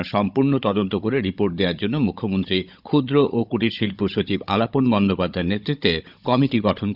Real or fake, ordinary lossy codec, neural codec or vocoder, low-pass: fake; none; codec, 16 kHz, 16 kbps, FunCodec, trained on Chinese and English, 50 frames a second; 5.4 kHz